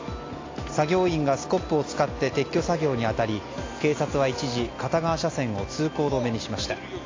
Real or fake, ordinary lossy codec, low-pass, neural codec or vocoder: real; AAC, 32 kbps; 7.2 kHz; none